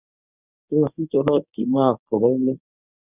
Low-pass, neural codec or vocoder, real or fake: 3.6 kHz; codec, 24 kHz, 0.9 kbps, WavTokenizer, medium speech release version 1; fake